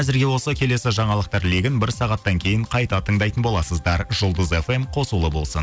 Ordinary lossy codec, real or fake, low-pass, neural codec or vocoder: none; real; none; none